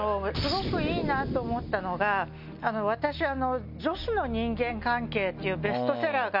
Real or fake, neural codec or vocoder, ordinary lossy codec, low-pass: real; none; none; 5.4 kHz